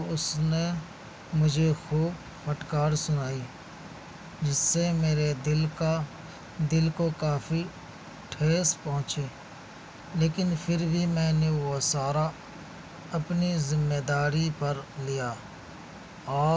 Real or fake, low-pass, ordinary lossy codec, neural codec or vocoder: real; none; none; none